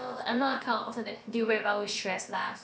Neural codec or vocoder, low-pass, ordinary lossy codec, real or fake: codec, 16 kHz, about 1 kbps, DyCAST, with the encoder's durations; none; none; fake